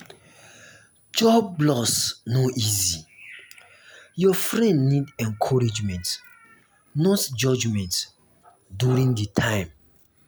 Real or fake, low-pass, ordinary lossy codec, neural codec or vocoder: real; none; none; none